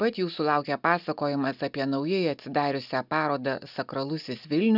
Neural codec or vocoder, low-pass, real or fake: none; 5.4 kHz; real